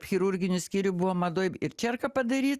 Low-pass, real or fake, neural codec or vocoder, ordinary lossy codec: 14.4 kHz; real; none; Opus, 64 kbps